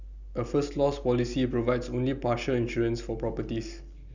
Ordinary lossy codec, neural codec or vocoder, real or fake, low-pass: none; none; real; 7.2 kHz